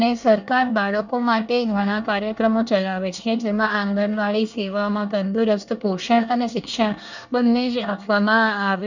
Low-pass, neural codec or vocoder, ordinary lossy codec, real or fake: 7.2 kHz; codec, 24 kHz, 1 kbps, SNAC; none; fake